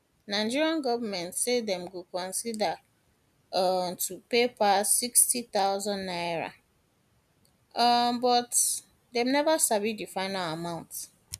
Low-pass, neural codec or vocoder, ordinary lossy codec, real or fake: 14.4 kHz; none; none; real